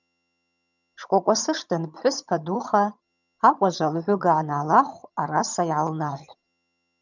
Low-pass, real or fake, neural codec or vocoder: 7.2 kHz; fake; vocoder, 22.05 kHz, 80 mel bands, HiFi-GAN